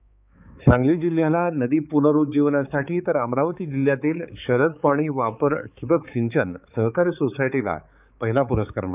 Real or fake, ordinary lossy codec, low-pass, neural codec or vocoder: fake; none; 3.6 kHz; codec, 16 kHz, 4 kbps, X-Codec, HuBERT features, trained on balanced general audio